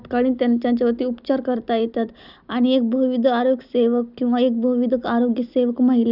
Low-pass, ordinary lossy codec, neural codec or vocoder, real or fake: 5.4 kHz; none; none; real